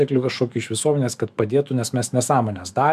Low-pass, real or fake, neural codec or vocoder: 14.4 kHz; fake; autoencoder, 48 kHz, 128 numbers a frame, DAC-VAE, trained on Japanese speech